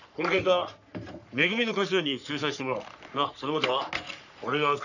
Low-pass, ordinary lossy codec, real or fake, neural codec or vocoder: 7.2 kHz; none; fake; codec, 44.1 kHz, 3.4 kbps, Pupu-Codec